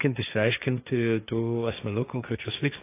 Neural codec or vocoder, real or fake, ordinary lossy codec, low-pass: codec, 16 kHz, 1.1 kbps, Voila-Tokenizer; fake; AAC, 24 kbps; 3.6 kHz